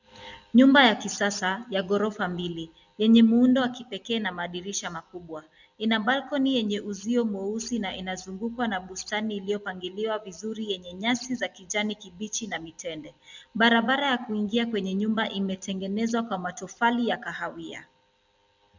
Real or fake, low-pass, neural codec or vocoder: real; 7.2 kHz; none